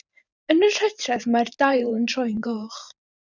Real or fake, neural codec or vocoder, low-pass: fake; vocoder, 44.1 kHz, 128 mel bands every 512 samples, BigVGAN v2; 7.2 kHz